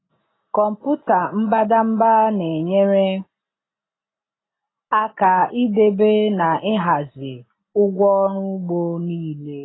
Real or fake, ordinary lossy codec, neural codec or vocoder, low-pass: real; AAC, 16 kbps; none; 7.2 kHz